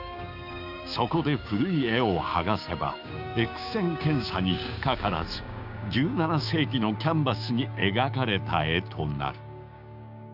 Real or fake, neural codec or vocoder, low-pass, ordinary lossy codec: fake; codec, 16 kHz, 6 kbps, DAC; 5.4 kHz; none